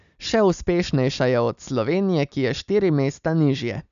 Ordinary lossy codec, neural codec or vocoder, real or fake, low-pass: none; none; real; 7.2 kHz